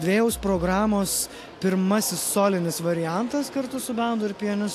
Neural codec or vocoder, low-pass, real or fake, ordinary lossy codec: none; 14.4 kHz; real; AAC, 64 kbps